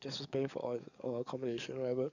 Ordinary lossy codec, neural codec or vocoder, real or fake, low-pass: none; codec, 44.1 kHz, 7.8 kbps, DAC; fake; 7.2 kHz